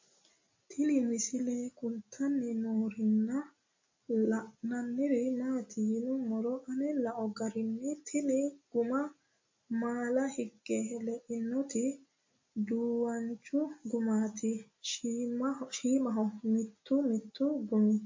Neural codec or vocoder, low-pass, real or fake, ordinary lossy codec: none; 7.2 kHz; real; MP3, 32 kbps